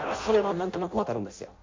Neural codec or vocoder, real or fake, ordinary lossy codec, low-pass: codec, 16 kHz in and 24 kHz out, 0.6 kbps, FireRedTTS-2 codec; fake; MP3, 48 kbps; 7.2 kHz